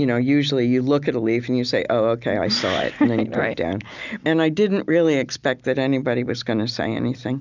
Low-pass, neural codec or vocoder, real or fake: 7.2 kHz; none; real